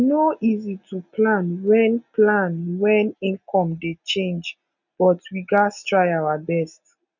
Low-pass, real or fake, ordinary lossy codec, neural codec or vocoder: 7.2 kHz; real; none; none